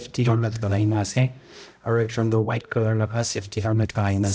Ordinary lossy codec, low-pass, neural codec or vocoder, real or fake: none; none; codec, 16 kHz, 0.5 kbps, X-Codec, HuBERT features, trained on balanced general audio; fake